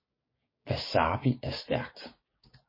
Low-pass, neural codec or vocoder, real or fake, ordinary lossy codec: 5.4 kHz; codec, 16 kHz, 6 kbps, DAC; fake; MP3, 24 kbps